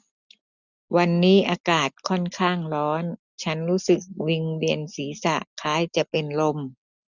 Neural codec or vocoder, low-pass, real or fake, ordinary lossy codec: none; 7.2 kHz; real; none